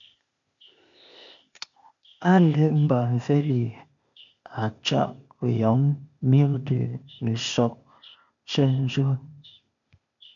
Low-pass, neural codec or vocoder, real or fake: 7.2 kHz; codec, 16 kHz, 0.8 kbps, ZipCodec; fake